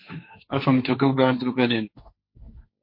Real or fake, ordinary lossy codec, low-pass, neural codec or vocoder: fake; MP3, 32 kbps; 5.4 kHz; codec, 16 kHz, 1.1 kbps, Voila-Tokenizer